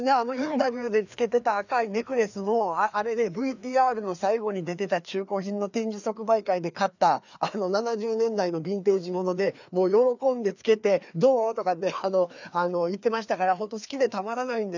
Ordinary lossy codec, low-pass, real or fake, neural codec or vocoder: none; 7.2 kHz; fake; codec, 16 kHz, 2 kbps, FreqCodec, larger model